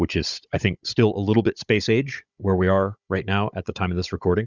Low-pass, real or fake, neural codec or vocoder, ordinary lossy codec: 7.2 kHz; fake; codec, 16 kHz, 16 kbps, FunCodec, trained on Chinese and English, 50 frames a second; Opus, 64 kbps